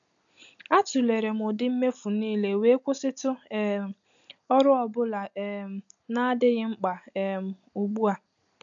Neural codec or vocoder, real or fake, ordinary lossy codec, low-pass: none; real; none; 7.2 kHz